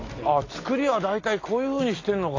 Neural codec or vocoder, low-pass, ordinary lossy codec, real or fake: none; 7.2 kHz; AAC, 32 kbps; real